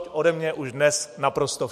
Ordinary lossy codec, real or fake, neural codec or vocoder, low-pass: MP3, 64 kbps; real; none; 14.4 kHz